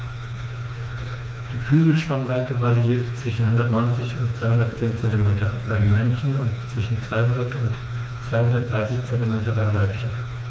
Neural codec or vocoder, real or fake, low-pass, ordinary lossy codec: codec, 16 kHz, 2 kbps, FreqCodec, smaller model; fake; none; none